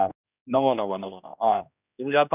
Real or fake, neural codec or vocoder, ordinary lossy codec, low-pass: fake; codec, 16 kHz, 1 kbps, X-Codec, HuBERT features, trained on general audio; none; 3.6 kHz